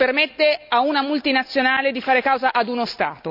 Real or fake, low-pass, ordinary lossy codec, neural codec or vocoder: real; 5.4 kHz; none; none